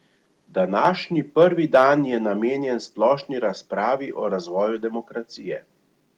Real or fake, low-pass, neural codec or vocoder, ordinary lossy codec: real; 19.8 kHz; none; Opus, 24 kbps